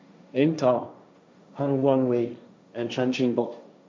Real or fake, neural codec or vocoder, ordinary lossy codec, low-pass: fake; codec, 16 kHz, 1.1 kbps, Voila-Tokenizer; none; none